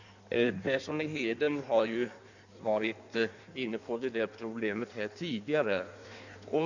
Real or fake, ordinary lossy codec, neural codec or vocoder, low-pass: fake; none; codec, 16 kHz in and 24 kHz out, 1.1 kbps, FireRedTTS-2 codec; 7.2 kHz